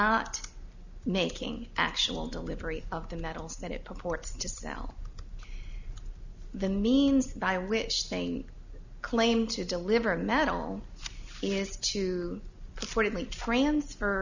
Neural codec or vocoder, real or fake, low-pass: none; real; 7.2 kHz